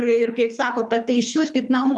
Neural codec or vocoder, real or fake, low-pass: codec, 24 kHz, 3 kbps, HILCodec; fake; 10.8 kHz